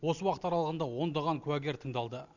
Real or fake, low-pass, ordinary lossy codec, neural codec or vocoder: real; 7.2 kHz; none; none